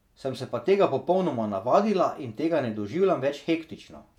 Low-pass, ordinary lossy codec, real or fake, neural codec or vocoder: 19.8 kHz; none; fake; vocoder, 48 kHz, 128 mel bands, Vocos